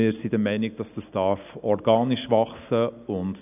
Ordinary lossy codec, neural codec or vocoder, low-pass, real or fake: none; none; 3.6 kHz; real